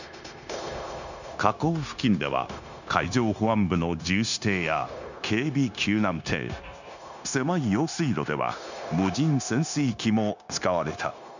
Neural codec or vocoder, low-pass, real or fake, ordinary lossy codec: codec, 16 kHz, 0.9 kbps, LongCat-Audio-Codec; 7.2 kHz; fake; none